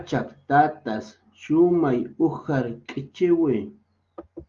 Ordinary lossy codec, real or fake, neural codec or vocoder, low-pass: Opus, 32 kbps; real; none; 7.2 kHz